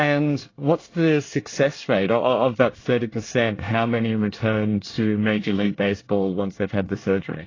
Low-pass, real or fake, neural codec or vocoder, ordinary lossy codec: 7.2 kHz; fake; codec, 24 kHz, 1 kbps, SNAC; AAC, 32 kbps